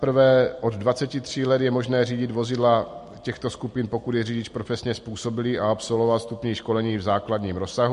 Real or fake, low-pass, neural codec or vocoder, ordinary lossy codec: real; 14.4 kHz; none; MP3, 48 kbps